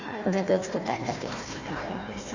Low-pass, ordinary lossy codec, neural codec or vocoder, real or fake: 7.2 kHz; Opus, 64 kbps; codec, 16 kHz, 1 kbps, FunCodec, trained on Chinese and English, 50 frames a second; fake